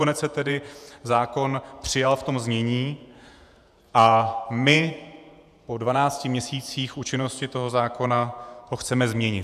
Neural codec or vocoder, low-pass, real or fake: vocoder, 48 kHz, 128 mel bands, Vocos; 14.4 kHz; fake